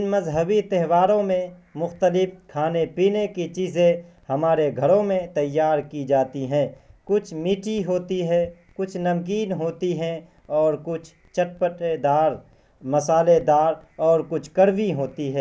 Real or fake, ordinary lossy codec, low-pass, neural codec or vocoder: real; none; none; none